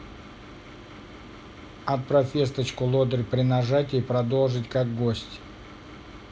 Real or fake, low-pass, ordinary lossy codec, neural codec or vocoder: real; none; none; none